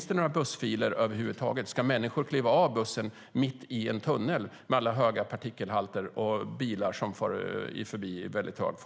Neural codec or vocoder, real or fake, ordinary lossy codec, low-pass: none; real; none; none